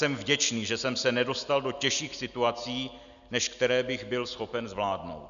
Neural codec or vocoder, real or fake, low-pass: none; real; 7.2 kHz